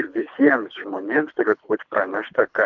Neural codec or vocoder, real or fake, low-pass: codec, 24 kHz, 3 kbps, HILCodec; fake; 7.2 kHz